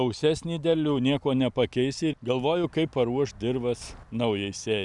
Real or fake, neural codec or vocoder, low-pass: real; none; 10.8 kHz